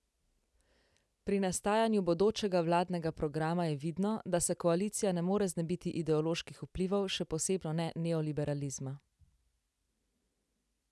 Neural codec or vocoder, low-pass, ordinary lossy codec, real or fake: none; none; none; real